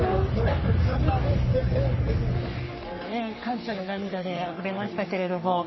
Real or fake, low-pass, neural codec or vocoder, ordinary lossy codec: fake; 7.2 kHz; codec, 44.1 kHz, 3.4 kbps, Pupu-Codec; MP3, 24 kbps